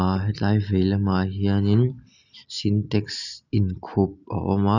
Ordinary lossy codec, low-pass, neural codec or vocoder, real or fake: none; 7.2 kHz; none; real